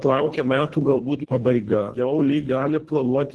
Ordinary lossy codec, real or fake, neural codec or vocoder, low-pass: Opus, 16 kbps; fake; codec, 24 kHz, 1.5 kbps, HILCodec; 10.8 kHz